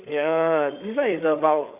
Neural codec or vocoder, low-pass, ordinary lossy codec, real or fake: codec, 16 kHz, 8 kbps, FreqCodec, larger model; 3.6 kHz; none; fake